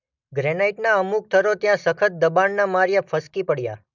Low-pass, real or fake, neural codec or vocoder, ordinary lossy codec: 7.2 kHz; real; none; none